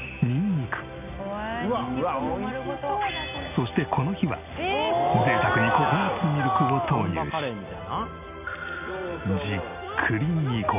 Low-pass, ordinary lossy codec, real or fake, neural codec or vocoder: 3.6 kHz; none; real; none